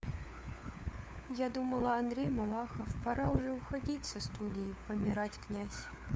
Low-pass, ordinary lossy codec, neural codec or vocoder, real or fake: none; none; codec, 16 kHz, 4 kbps, FunCodec, trained on LibriTTS, 50 frames a second; fake